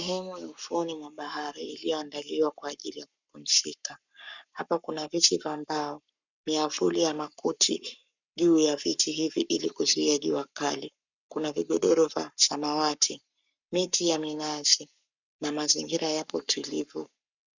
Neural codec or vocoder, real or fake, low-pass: codec, 44.1 kHz, 7.8 kbps, Pupu-Codec; fake; 7.2 kHz